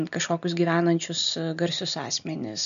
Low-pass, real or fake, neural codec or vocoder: 7.2 kHz; real; none